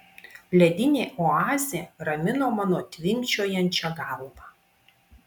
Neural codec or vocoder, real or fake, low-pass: none; real; 19.8 kHz